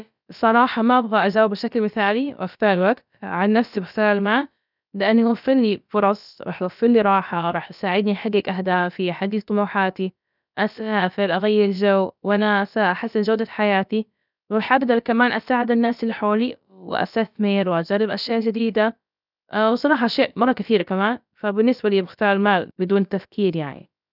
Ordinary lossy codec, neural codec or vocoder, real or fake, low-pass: none; codec, 16 kHz, about 1 kbps, DyCAST, with the encoder's durations; fake; 5.4 kHz